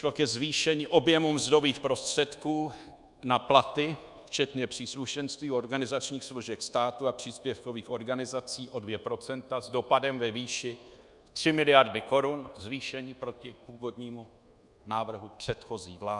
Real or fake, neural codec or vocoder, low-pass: fake; codec, 24 kHz, 1.2 kbps, DualCodec; 10.8 kHz